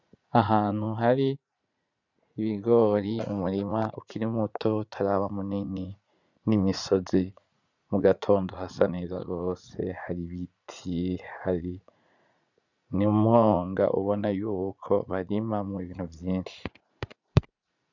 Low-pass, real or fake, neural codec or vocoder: 7.2 kHz; fake; vocoder, 44.1 kHz, 80 mel bands, Vocos